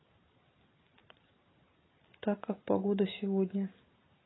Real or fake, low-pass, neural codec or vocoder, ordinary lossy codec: real; 7.2 kHz; none; AAC, 16 kbps